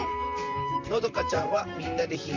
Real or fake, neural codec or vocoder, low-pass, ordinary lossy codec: fake; vocoder, 44.1 kHz, 128 mel bands, Pupu-Vocoder; 7.2 kHz; MP3, 64 kbps